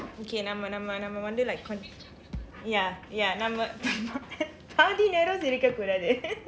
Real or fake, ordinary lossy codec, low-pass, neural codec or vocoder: real; none; none; none